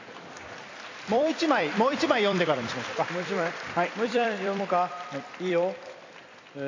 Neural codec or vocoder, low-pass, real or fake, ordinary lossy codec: vocoder, 44.1 kHz, 128 mel bands every 256 samples, BigVGAN v2; 7.2 kHz; fake; AAC, 32 kbps